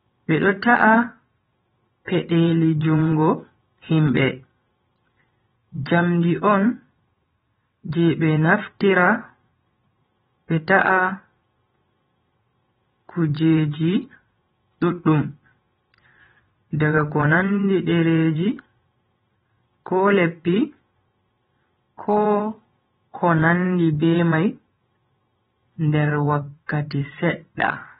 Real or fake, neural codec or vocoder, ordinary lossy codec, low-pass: fake; vocoder, 22.05 kHz, 80 mel bands, WaveNeXt; AAC, 16 kbps; 9.9 kHz